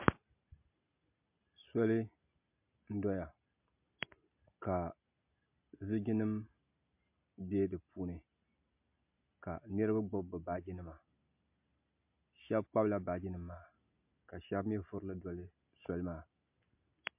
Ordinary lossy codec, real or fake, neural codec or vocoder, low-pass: MP3, 32 kbps; real; none; 3.6 kHz